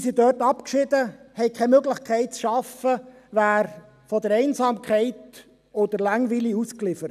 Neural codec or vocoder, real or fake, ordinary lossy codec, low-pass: none; real; none; 14.4 kHz